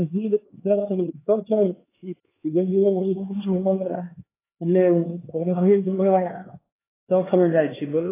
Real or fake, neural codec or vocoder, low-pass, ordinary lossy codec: fake; codec, 16 kHz, 2 kbps, X-Codec, HuBERT features, trained on LibriSpeech; 3.6 kHz; AAC, 16 kbps